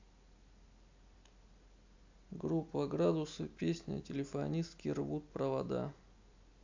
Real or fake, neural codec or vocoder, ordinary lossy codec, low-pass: real; none; MP3, 64 kbps; 7.2 kHz